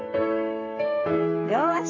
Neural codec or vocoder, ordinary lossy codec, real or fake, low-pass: codec, 44.1 kHz, 7.8 kbps, Pupu-Codec; AAC, 48 kbps; fake; 7.2 kHz